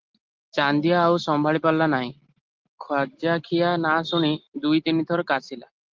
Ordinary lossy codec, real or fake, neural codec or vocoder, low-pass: Opus, 16 kbps; real; none; 7.2 kHz